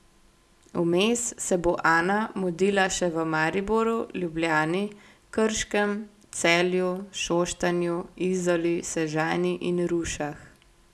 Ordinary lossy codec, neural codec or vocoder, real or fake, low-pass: none; none; real; none